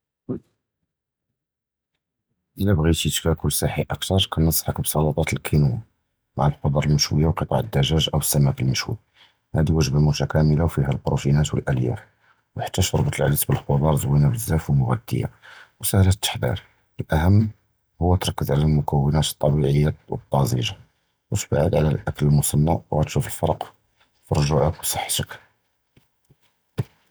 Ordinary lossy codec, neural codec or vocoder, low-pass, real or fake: none; none; none; real